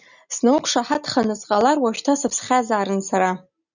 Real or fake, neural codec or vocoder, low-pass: real; none; 7.2 kHz